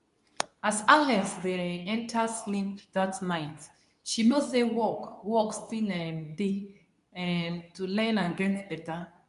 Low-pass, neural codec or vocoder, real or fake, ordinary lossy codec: 10.8 kHz; codec, 24 kHz, 0.9 kbps, WavTokenizer, medium speech release version 2; fake; none